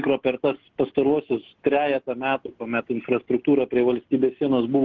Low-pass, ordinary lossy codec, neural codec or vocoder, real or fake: 7.2 kHz; Opus, 16 kbps; none; real